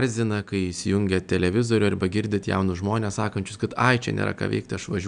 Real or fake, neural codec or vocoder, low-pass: real; none; 9.9 kHz